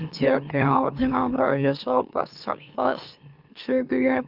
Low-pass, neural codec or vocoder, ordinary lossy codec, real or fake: 5.4 kHz; autoencoder, 44.1 kHz, a latent of 192 numbers a frame, MeloTTS; Opus, 24 kbps; fake